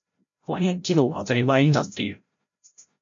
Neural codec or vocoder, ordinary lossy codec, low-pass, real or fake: codec, 16 kHz, 0.5 kbps, FreqCodec, larger model; MP3, 48 kbps; 7.2 kHz; fake